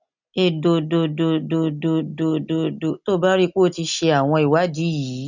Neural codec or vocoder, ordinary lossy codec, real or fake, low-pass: none; none; real; 7.2 kHz